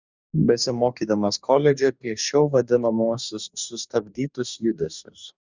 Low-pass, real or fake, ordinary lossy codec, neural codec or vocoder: 7.2 kHz; fake; Opus, 64 kbps; codec, 44.1 kHz, 2.6 kbps, DAC